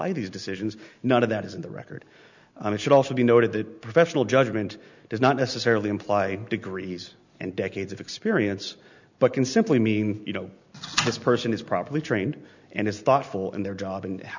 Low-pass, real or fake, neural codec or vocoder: 7.2 kHz; real; none